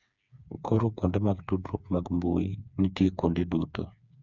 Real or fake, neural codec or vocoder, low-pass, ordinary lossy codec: fake; codec, 16 kHz, 4 kbps, FreqCodec, smaller model; 7.2 kHz; none